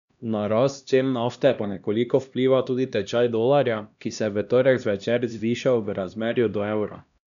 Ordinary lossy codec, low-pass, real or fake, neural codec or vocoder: none; 7.2 kHz; fake; codec, 16 kHz, 1 kbps, X-Codec, HuBERT features, trained on LibriSpeech